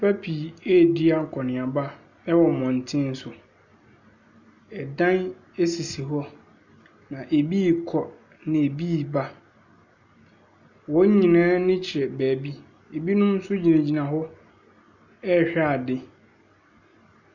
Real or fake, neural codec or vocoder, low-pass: real; none; 7.2 kHz